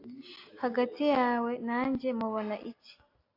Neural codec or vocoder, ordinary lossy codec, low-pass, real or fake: none; AAC, 32 kbps; 5.4 kHz; real